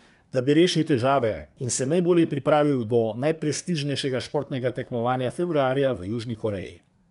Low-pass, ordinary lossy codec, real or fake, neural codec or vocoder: 10.8 kHz; none; fake; codec, 24 kHz, 1 kbps, SNAC